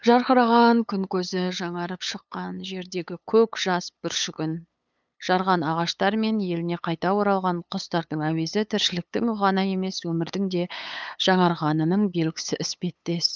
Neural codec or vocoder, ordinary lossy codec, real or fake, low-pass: codec, 16 kHz, 8 kbps, FunCodec, trained on LibriTTS, 25 frames a second; none; fake; none